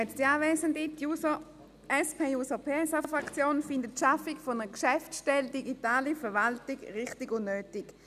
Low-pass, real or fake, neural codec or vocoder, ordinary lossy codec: 14.4 kHz; real; none; none